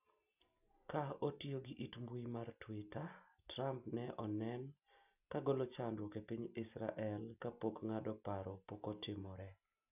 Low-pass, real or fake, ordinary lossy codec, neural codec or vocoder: 3.6 kHz; real; none; none